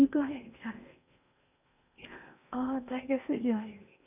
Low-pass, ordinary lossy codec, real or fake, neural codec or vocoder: 3.6 kHz; none; fake; codec, 16 kHz in and 24 kHz out, 0.8 kbps, FocalCodec, streaming, 65536 codes